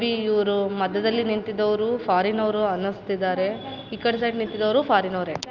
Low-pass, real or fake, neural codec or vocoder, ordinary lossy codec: 7.2 kHz; real; none; Opus, 24 kbps